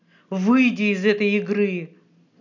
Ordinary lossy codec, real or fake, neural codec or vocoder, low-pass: none; real; none; 7.2 kHz